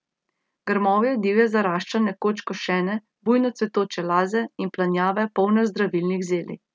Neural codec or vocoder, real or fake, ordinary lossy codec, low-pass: none; real; none; none